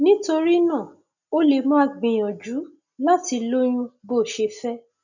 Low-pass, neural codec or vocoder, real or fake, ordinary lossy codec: 7.2 kHz; none; real; none